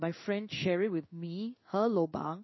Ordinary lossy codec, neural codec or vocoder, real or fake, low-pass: MP3, 24 kbps; codec, 16 kHz in and 24 kHz out, 1 kbps, XY-Tokenizer; fake; 7.2 kHz